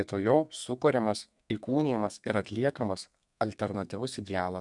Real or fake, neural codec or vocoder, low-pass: fake; codec, 32 kHz, 1.9 kbps, SNAC; 10.8 kHz